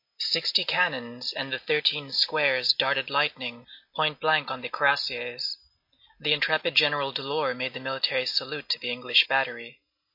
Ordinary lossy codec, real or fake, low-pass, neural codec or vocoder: MP3, 32 kbps; real; 5.4 kHz; none